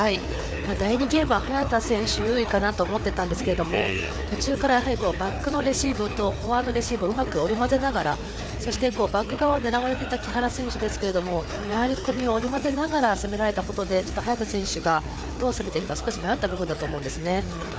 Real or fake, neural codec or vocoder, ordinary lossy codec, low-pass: fake; codec, 16 kHz, 4 kbps, FreqCodec, larger model; none; none